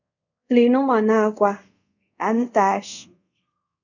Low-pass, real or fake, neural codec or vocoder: 7.2 kHz; fake; codec, 24 kHz, 0.5 kbps, DualCodec